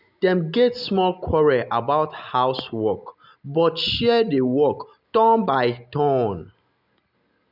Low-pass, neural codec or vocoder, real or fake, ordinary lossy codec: 5.4 kHz; none; real; none